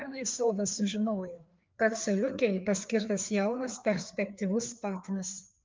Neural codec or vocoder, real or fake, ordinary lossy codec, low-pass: codec, 16 kHz, 2 kbps, FreqCodec, larger model; fake; Opus, 32 kbps; 7.2 kHz